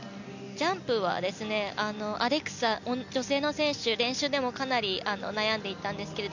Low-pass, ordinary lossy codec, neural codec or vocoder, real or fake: 7.2 kHz; none; none; real